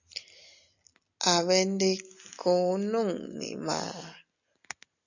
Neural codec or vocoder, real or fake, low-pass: none; real; 7.2 kHz